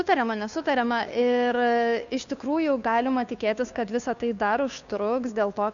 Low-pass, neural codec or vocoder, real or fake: 7.2 kHz; codec, 16 kHz, 2 kbps, FunCodec, trained on Chinese and English, 25 frames a second; fake